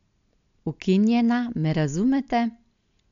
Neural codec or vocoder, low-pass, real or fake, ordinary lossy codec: none; 7.2 kHz; real; MP3, 64 kbps